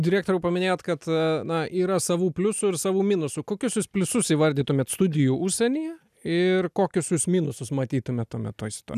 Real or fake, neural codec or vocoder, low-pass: real; none; 14.4 kHz